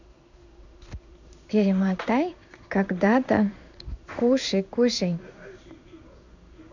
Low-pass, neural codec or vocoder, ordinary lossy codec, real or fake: 7.2 kHz; codec, 16 kHz in and 24 kHz out, 1 kbps, XY-Tokenizer; none; fake